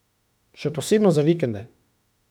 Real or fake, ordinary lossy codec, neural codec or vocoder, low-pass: fake; none; autoencoder, 48 kHz, 32 numbers a frame, DAC-VAE, trained on Japanese speech; 19.8 kHz